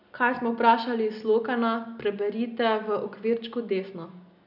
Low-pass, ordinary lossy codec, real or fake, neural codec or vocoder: 5.4 kHz; none; real; none